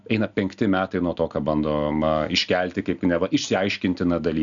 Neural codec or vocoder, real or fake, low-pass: none; real; 7.2 kHz